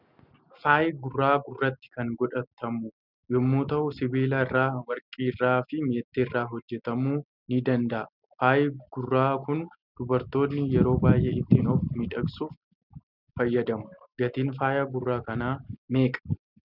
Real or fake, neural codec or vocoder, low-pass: real; none; 5.4 kHz